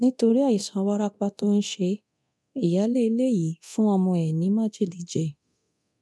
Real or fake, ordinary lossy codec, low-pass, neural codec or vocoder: fake; none; none; codec, 24 kHz, 0.9 kbps, DualCodec